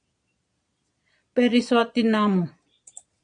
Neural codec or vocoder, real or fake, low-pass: vocoder, 22.05 kHz, 80 mel bands, Vocos; fake; 9.9 kHz